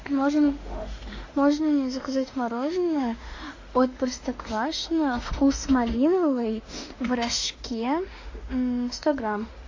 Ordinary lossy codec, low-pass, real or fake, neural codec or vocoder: AAC, 48 kbps; 7.2 kHz; fake; autoencoder, 48 kHz, 32 numbers a frame, DAC-VAE, trained on Japanese speech